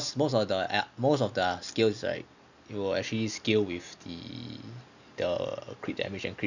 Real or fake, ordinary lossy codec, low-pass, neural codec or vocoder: real; none; 7.2 kHz; none